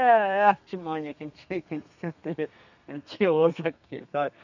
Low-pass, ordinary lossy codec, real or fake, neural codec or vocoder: 7.2 kHz; none; fake; codec, 32 kHz, 1.9 kbps, SNAC